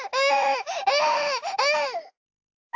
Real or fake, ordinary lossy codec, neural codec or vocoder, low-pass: fake; AAC, 48 kbps; autoencoder, 48 kHz, 32 numbers a frame, DAC-VAE, trained on Japanese speech; 7.2 kHz